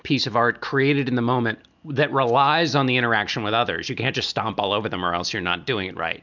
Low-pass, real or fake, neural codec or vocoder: 7.2 kHz; real; none